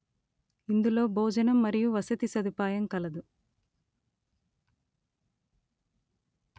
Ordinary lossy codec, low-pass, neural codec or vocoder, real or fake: none; none; none; real